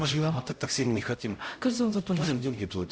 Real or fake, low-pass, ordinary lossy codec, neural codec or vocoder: fake; none; none; codec, 16 kHz, 0.5 kbps, X-Codec, HuBERT features, trained on LibriSpeech